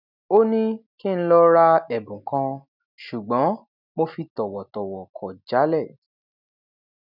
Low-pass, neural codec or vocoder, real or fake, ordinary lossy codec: 5.4 kHz; none; real; none